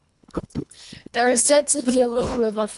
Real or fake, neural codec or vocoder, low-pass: fake; codec, 24 kHz, 1.5 kbps, HILCodec; 10.8 kHz